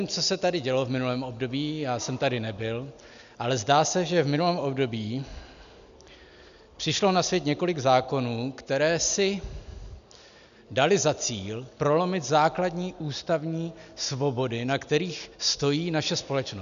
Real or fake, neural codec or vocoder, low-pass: real; none; 7.2 kHz